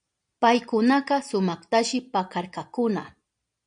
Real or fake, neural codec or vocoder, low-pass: real; none; 9.9 kHz